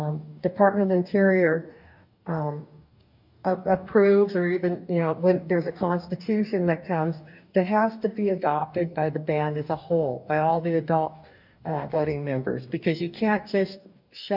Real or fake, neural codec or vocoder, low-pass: fake; codec, 44.1 kHz, 2.6 kbps, DAC; 5.4 kHz